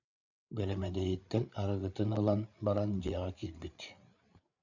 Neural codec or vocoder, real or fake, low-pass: codec, 16 kHz, 8 kbps, FreqCodec, larger model; fake; 7.2 kHz